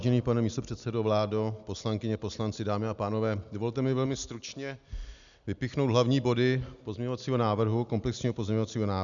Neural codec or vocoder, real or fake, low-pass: none; real; 7.2 kHz